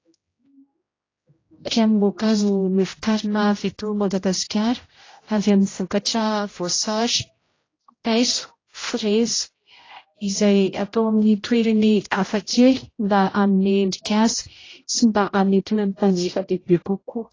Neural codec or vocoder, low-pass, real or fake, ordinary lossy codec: codec, 16 kHz, 0.5 kbps, X-Codec, HuBERT features, trained on general audio; 7.2 kHz; fake; AAC, 32 kbps